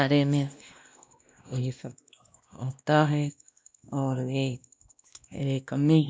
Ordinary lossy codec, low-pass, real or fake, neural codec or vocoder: none; none; fake; codec, 16 kHz, 1 kbps, X-Codec, WavLM features, trained on Multilingual LibriSpeech